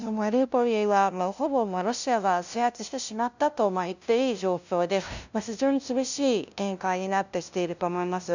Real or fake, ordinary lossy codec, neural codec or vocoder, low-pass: fake; none; codec, 16 kHz, 0.5 kbps, FunCodec, trained on LibriTTS, 25 frames a second; 7.2 kHz